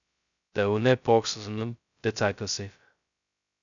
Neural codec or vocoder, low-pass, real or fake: codec, 16 kHz, 0.2 kbps, FocalCodec; 7.2 kHz; fake